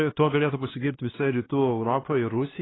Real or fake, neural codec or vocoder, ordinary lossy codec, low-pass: fake; codec, 16 kHz, 2 kbps, X-Codec, HuBERT features, trained on LibriSpeech; AAC, 16 kbps; 7.2 kHz